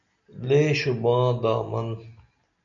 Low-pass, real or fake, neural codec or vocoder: 7.2 kHz; real; none